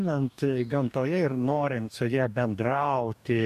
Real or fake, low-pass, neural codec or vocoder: fake; 14.4 kHz; codec, 44.1 kHz, 2.6 kbps, DAC